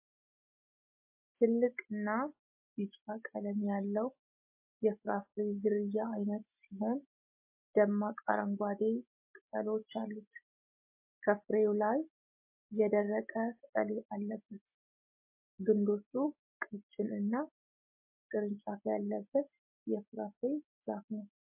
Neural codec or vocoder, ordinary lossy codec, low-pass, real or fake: none; MP3, 24 kbps; 3.6 kHz; real